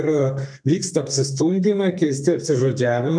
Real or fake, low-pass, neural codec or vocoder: fake; 9.9 kHz; codec, 32 kHz, 1.9 kbps, SNAC